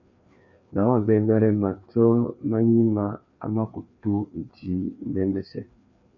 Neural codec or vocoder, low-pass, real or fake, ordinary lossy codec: codec, 16 kHz, 2 kbps, FreqCodec, larger model; 7.2 kHz; fake; MP3, 48 kbps